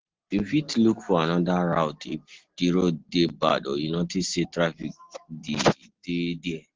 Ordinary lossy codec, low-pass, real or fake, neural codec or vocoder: Opus, 16 kbps; 7.2 kHz; real; none